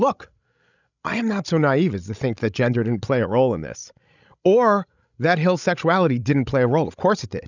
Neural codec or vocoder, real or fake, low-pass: codec, 16 kHz, 16 kbps, FreqCodec, larger model; fake; 7.2 kHz